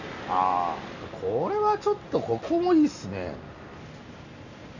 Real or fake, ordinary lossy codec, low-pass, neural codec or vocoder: real; none; 7.2 kHz; none